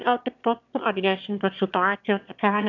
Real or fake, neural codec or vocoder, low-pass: fake; autoencoder, 22.05 kHz, a latent of 192 numbers a frame, VITS, trained on one speaker; 7.2 kHz